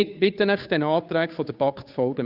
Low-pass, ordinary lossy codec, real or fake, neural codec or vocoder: 5.4 kHz; Opus, 64 kbps; fake; codec, 16 kHz, 2 kbps, FunCodec, trained on Chinese and English, 25 frames a second